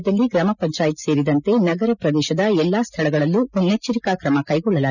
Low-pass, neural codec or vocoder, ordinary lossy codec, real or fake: 7.2 kHz; none; none; real